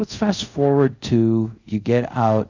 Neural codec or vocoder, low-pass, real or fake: codec, 24 kHz, 0.5 kbps, DualCodec; 7.2 kHz; fake